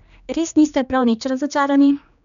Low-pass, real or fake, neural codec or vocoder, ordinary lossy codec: 7.2 kHz; fake; codec, 16 kHz, 2 kbps, X-Codec, HuBERT features, trained on general audio; none